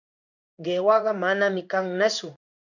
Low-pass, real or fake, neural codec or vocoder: 7.2 kHz; fake; codec, 16 kHz in and 24 kHz out, 1 kbps, XY-Tokenizer